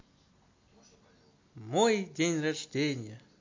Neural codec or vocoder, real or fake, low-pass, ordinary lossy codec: none; real; 7.2 kHz; MP3, 48 kbps